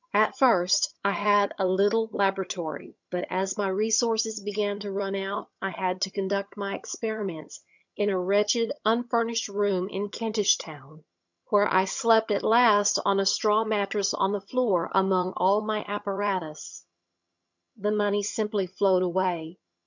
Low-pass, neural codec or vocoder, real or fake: 7.2 kHz; vocoder, 22.05 kHz, 80 mel bands, HiFi-GAN; fake